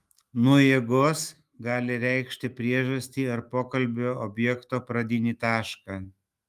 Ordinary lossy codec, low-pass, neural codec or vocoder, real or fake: Opus, 32 kbps; 14.4 kHz; autoencoder, 48 kHz, 128 numbers a frame, DAC-VAE, trained on Japanese speech; fake